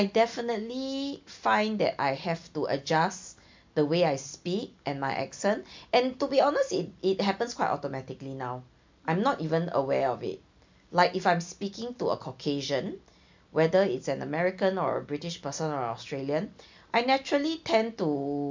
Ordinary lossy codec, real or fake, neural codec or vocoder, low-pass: MP3, 64 kbps; real; none; 7.2 kHz